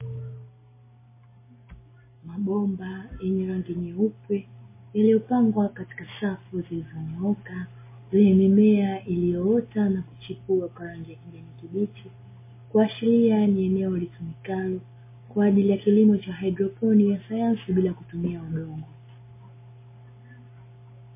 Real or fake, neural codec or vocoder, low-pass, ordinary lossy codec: real; none; 3.6 kHz; MP3, 16 kbps